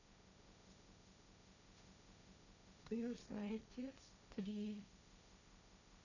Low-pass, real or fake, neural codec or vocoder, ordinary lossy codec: 7.2 kHz; fake; codec, 16 kHz, 1.1 kbps, Voila-Tokenizer; none